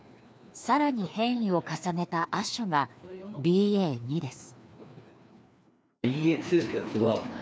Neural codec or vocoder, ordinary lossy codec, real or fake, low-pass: codec, 16 kHz, 2 kbps, FreqCodec, larger model; none; fake; none